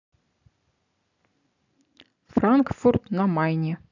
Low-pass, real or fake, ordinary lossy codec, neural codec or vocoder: 7.2 kHz; real; none; none